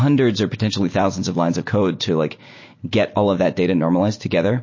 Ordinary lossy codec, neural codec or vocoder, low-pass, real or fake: MP3, 32 kbps; none; 7.2 kHz; real